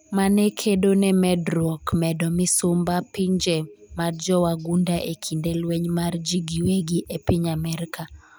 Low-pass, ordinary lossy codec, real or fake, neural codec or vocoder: none; none; real; none